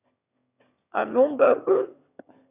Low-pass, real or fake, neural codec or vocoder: 3.6 kHz; fake; autoencoder, 22.05 kHz, a latent of 192 numbers a frame, VITS, trained on one speaker